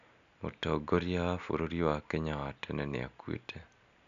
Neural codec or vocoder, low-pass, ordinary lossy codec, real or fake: none; 7.2 kHz; none; real